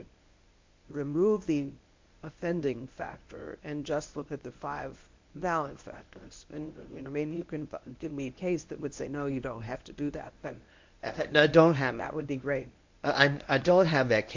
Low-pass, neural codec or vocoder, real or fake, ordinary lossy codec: 7.2 kHz; codec, 24 kHz, 0.9 kbps, WavTokenizer, medium speech release version 1; fake; MP3, 48 kbps